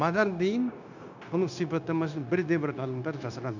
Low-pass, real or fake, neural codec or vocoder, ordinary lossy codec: 7.2 kHz; fake; codec, 16 kHz, 0.9 kbps, LongCat-Audio-Codec; none